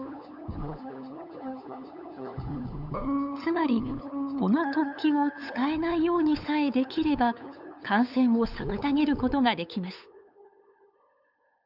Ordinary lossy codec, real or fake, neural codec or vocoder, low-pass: none; fake; codec, 16 kHz, 8 kbps, FunCodec, trained on LibriTTS, 25 frames a second; 5.4 kHz